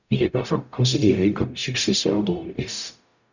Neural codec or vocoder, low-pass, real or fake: codec, 44.1 kHz, 0.9 kbps, DAC; 7.2 kHz; fake